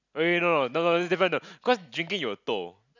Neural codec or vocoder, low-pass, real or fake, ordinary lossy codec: none; 7.2 kHz; real; none